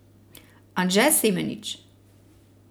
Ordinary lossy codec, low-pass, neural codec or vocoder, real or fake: none; none; none; real